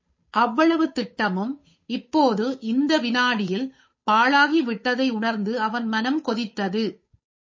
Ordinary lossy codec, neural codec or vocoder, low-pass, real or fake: MP3, 32 kbps; codec, 16 kHz, 8 kbps, FunCodec, trained on Chinese and English, 25 frames a second; 7.2 kHz; fake